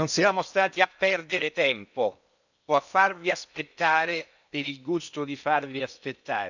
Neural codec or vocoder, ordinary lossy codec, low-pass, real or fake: codec, 16 kHz in and 24 kHz out, 0.8 kbps, FocalCodec, streaming, 65536 codes; none; 7.2 kHz; fake